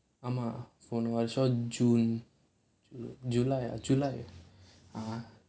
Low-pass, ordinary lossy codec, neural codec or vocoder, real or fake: none; none; none; real